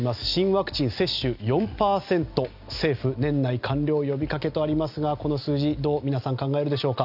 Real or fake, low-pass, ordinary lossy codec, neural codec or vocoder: real; 5.4 kHz; none; none